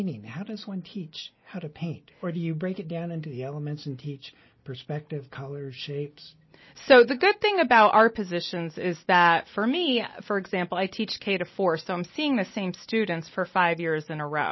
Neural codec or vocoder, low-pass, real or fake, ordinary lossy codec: none; 7.2 kHz; real; MP3, 24 kbps